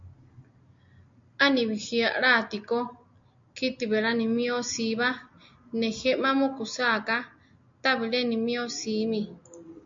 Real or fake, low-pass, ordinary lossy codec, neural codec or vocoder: real; 7.2 kHz; MP3, 48 kbps; none